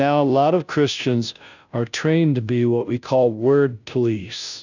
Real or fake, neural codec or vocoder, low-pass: fake; codec, 16 kHz, 0.5 kbps, FunCodec, trained on Chinese and English, 25 frames a second; 7.2 kHz